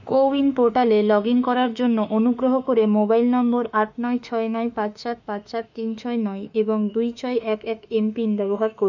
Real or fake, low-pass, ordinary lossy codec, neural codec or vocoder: fake; 7.2 kHz; none; autoencoder, 48 kHz, 32 numbers a frame, DAC-VAE, trained on Japanese speech